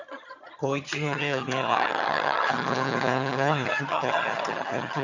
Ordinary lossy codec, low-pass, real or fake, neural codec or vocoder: none; 7.2 kHz; fake; vocoder, 22.05 kHz, 80 mel bands, HiFi-GAN